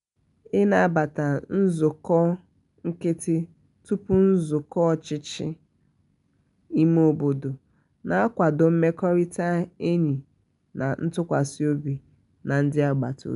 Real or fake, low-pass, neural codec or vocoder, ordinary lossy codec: real; 10.8 kHz; none; none